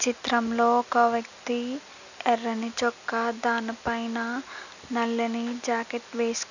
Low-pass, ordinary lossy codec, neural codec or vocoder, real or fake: 7.2 kHz; none; none; real